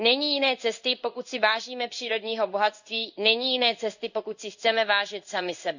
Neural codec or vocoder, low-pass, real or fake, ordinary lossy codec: codec, 16 kHz in and 24 kHz out, 1 kbps, XY-Tokenizer; 7.2 kHz; fake; none